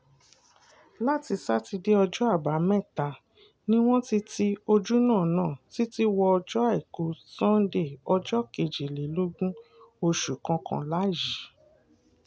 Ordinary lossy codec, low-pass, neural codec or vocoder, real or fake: none; none; none; real